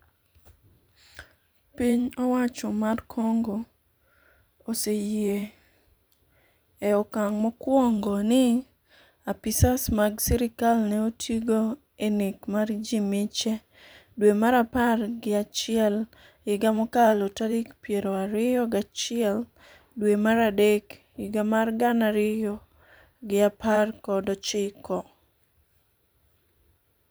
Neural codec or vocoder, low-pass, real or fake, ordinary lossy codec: vocoder, 44.1 kHz, 128 mel bands every 512 samples, BigVGAN v2; none; fake; none